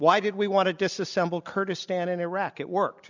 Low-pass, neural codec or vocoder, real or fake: 7.2 kHz; none; real